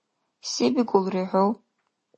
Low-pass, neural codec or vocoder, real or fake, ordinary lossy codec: 9.9 kHz; none; real; MP3, 32 kbps